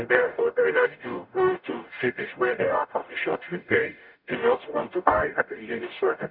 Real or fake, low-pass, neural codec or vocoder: fake; 5.4 kHz; codec, 44.1 kHz, 0.9 kbps, DAC